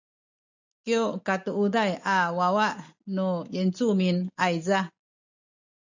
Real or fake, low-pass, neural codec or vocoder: real; 7.2 kHz; none